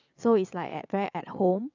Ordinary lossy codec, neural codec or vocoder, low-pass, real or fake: none; vocoder, 22.05 kHz, 80 mel bands, WaveNeXt; 7.2 kHz; fake